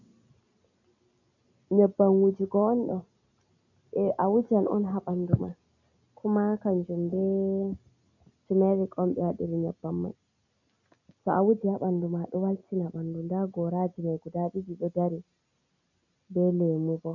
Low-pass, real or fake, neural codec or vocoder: 7.2 kHz; real; none